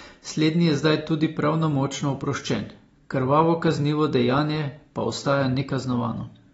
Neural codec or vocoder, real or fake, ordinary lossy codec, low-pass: none; real; AAC, 24 kbps; 19.8 kHz